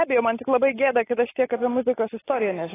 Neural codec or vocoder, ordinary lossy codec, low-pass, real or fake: none; AAC, 16 kbps; 3.6 kHz; real